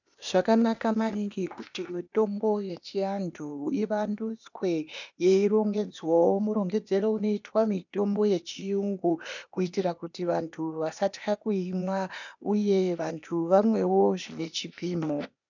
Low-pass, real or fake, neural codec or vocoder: 7.2 kHz; fake; codec, 16 kHz, 0.8 kbps, ZipCodec